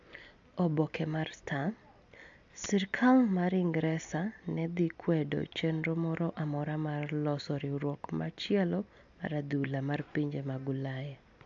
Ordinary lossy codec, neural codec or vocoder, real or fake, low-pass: AAC, 64 kbps; none; real; 7.2 kHz